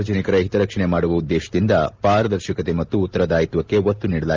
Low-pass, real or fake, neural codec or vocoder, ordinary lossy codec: 7.2 kHz; real; none; Opus, 32 kbps